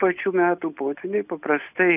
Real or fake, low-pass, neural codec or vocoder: real; 3.6 kHz; none